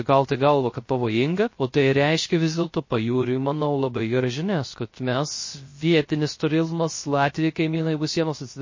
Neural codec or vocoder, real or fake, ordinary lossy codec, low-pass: codec, 16 kHz, 0.3 kbps, FocalCodec; fake; MP3, 32 kbps; 7.2 kHz